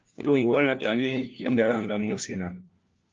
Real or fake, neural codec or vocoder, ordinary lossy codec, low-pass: fake; codec, 16 kHz, 1 kbps, FunCodec, trained on LibriTTS, 50 frames a second; Opus, 24 kbps; 7.2 kHz